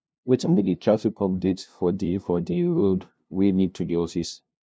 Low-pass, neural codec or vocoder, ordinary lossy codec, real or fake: none; codec, 16 kHz, 0.5 kbps, FunCodec, trained on LibriTTS, 25 frames a second; none; fake